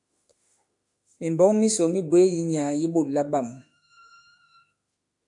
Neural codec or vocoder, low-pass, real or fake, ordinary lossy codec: autoencoder, 48 kHz, 32 numbers a frame, DAC-VAE, trained on Japanese speech; 10.8 kHz; fake; AAC, 64 kbps